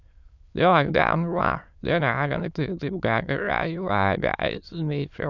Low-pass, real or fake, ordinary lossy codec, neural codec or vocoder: 7.2 kHz; fake; none; autoencoder, 22.05 kHz, a latent of 192 numbers a frame, VITS, trained on many speakers